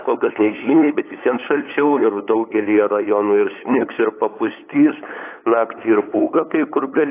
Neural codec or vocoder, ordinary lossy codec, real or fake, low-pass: codec, 16 kHz, 8 kbps, FunCodec, trained on LibriTTS, 25 frames a second; AAC, 24 kbps; fake; 3.6 kHz